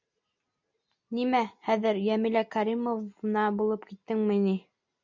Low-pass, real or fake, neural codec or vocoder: 7.2 kHz; real; none